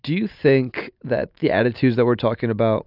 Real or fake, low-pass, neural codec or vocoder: real; 5.4 kHz; none